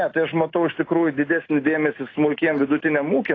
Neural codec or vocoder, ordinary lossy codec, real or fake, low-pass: none; AAC, 32 kbps; real; 7.2 kHz